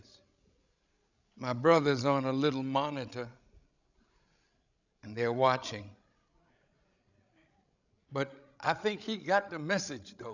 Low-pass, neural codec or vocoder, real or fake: 7.2 kHz; codec, 16 kHz, 16 kbps, FreqCodec, larger model; fake